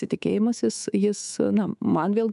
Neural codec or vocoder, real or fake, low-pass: codec, 24 kHz, 3.1 kbps, DualCodec; fake; 10.8 kHz